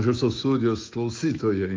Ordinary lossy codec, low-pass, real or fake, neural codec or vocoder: Opus, 32 kbps; 7.2 kHz; fake; autoencoder, 48 kHz, 128 numbers a frame, DAC-VAE, trained on Japanese speech